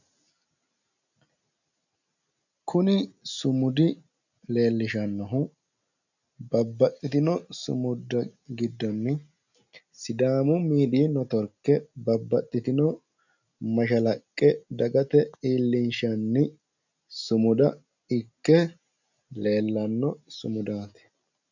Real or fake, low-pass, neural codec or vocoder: real; 7.2 kHz; none